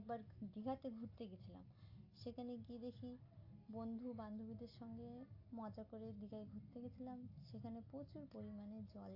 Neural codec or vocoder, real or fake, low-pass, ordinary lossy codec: none; real; 5.4 kHz; AAC, 32 kbps